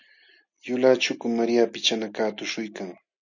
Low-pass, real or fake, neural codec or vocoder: 7.2 kHz; real; none